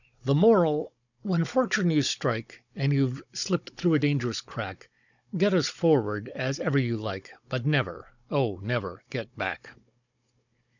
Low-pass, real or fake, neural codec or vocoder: 7.2 kHz; fake; codec, 16 kHz, 16 kbps, FunCodec, trained on Chinese and English, 50 frames a second